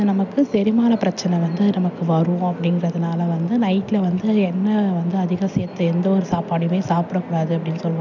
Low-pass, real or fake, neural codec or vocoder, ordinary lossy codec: 7.2 kHz; real; none; none